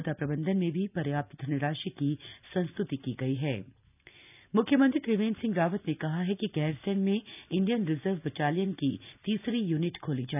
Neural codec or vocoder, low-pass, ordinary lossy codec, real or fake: none; 3.6 kHz; none; real